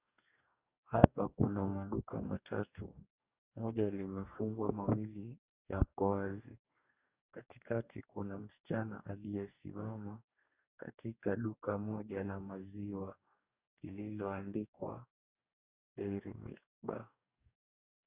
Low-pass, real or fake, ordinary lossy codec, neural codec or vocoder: 3.6 kHz; fake; Opus, 64 kbps; codec, 44.1 kHz, 2.6 kbps, DAC